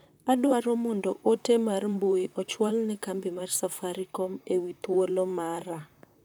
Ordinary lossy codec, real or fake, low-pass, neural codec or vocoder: none; fake; none; vocoder, 44.1 kHz, 128 mel bands, Pupu-Vocoder